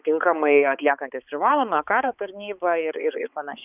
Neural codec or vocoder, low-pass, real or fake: codec, 16 kHz, 4 kbps, X-Codec, HuBERT features, trained on balanced general audio; 3.6 kHz; fake